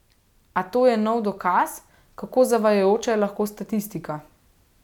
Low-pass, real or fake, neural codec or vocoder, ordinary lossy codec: 19.8 kHz; real; none; none